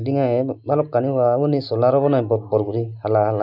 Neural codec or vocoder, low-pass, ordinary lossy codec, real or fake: none; 5.4 kHz; none; real